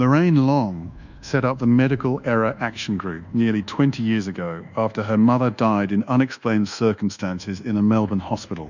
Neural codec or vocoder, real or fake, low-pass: codec, 24 kHz, 1.2 kbps, DualCodec; fake; 7.2 kHz